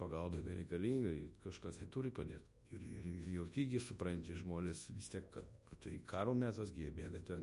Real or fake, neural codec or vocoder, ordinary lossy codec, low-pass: fake; codec, 24 kHz, 0.9 kbps, WavTokenizer, large speech release; MP3, 48 kbps; 10.8 kHz